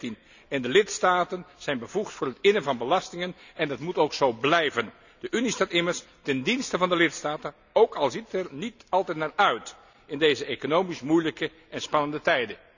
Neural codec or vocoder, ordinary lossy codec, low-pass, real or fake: none; none; 7.2 kHz; real